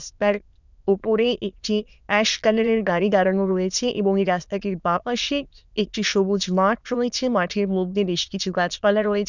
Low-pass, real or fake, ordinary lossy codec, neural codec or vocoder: 7.2 kHz; fake; none; autoencoder, 22.05 kHz, a latent of 192 numbers a frame, VITS, trained on many speakers